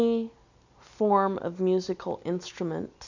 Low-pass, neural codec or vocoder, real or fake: 7.2 kHz; none; real